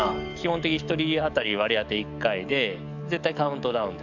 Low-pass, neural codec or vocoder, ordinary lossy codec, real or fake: 7.2 kHz; codec, 44.1 kHz, 7.8 kbps, DAC; none; fake